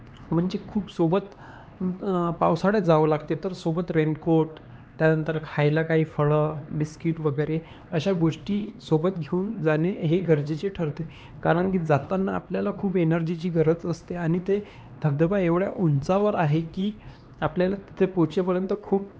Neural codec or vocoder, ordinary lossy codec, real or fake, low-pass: codec, 16 kHz, 2 kbps, X-Codec, HuBERT features, trained on LibriSpeech; none; fake; none